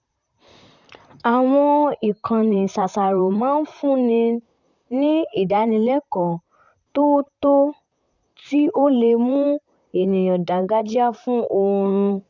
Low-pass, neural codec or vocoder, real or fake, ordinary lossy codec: 7.2 kHz; vocoder, 44.1 kHz, 128 mel bands, Pupu-Vocoder; fake; none